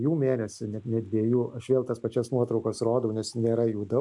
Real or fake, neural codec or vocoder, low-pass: real; none; 10.8 kHz